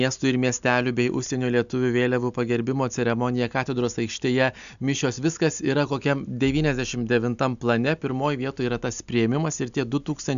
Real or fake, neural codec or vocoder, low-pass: real; none; 7.2 kHz